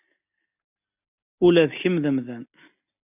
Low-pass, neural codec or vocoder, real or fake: 3.6 kHz; none; real